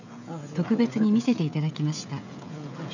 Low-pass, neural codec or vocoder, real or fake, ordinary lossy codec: 7.2 kHz; codec, 16 kHz, 16 kbps, FreqCodec, smaller model; fake; none